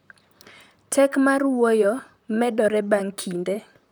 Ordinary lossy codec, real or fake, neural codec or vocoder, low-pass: none; fake; vocoder, 44.1 kHz, 128 mel bands, Pupu-Vocoder; none